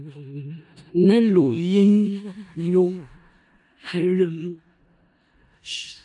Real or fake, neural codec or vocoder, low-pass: fake; codec, 16 kHz in and 24 kHz out, 0.4 kbps, LongCat-Audio-Codec, four codebook decoder; 10.8 kHz